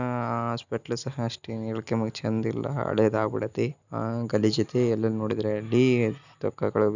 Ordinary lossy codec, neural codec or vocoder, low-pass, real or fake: none; none; 7.2 kHz; real